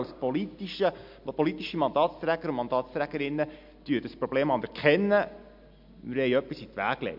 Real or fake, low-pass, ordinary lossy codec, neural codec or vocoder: real; 5.4 kHz; MP3, 48 kbps; none